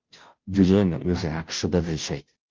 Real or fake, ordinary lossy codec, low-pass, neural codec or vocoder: fake; Opus, 24 kbps; 7.2 kHz; codec, 16 kHz, 0.5 kbps, FunCodec, trained on Chinese and English, 25 frames a second